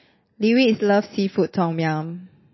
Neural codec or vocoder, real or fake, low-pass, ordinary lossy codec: none; real; 7.2 kHz; MP3, 24 kbps